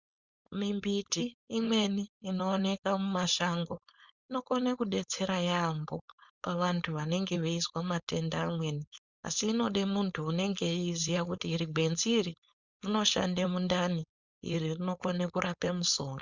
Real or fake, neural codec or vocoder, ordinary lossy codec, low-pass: fake; codec, 16 kHz, 4.8 kbps, FACodec; Opus, 64 kbps; 7.2 kHz